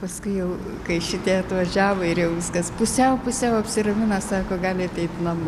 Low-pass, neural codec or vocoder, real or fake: 14.4 kHz; none; real